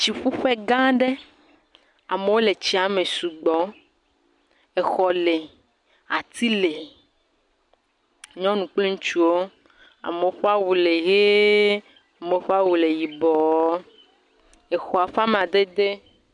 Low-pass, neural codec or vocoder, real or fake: 10.8 kHz; none; real